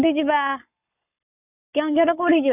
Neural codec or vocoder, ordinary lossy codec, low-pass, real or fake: codec, 44.1 kHz, 7.8 kbps, Pupu-Codec; none; 3.6 kHz; fake